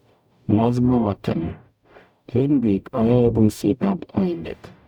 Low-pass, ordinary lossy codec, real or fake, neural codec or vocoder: 19.8 kHz; none; fake; codec, 44.1 kHz, 0.9 kbps, DAC